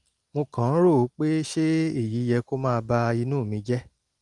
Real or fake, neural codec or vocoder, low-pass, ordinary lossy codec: real; none; 10.8 kHz; Opus, 32 kbps